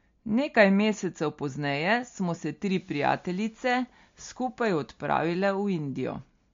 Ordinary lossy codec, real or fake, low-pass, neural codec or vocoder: MP3, 48 kbps; real; 7.2 kHz; none